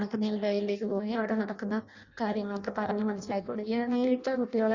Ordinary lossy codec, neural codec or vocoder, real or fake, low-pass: Opus, 64 kbps; codec, 16 kHz in and 24 kHz out, 0.6 kbps, FireRedTTS-2 codec; fake; 7.2 kHz